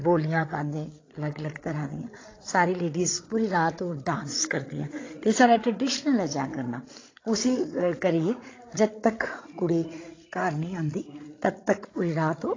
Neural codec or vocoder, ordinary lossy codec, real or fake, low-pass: codec, 16 kHz, 16 kbps, FreqCodec, larger model; AAC, 32 kbps; fake; 7.2 kHz